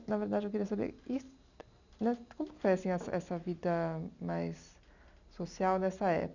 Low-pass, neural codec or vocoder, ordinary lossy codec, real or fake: 7.2 kHz; none; none; real